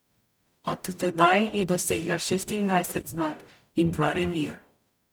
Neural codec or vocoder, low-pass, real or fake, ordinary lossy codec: codec, 44.1 kHz, 0.9 kbps, DAC; none; fake; none